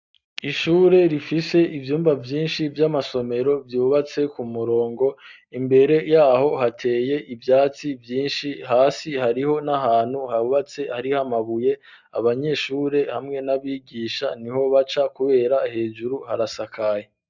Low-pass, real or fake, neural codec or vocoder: 7.2 kHz; real; none